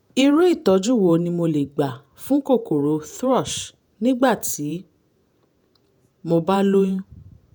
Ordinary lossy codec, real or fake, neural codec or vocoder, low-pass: none; fake; vocoder, 48 kHz, 128 mel bands, Vocos; none